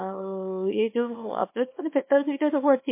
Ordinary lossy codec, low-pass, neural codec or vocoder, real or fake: MP3, 24 kbps; 3.6 kHz; codec, 24 kHz, 0.9 kbps, WavTokenizer, small release; fake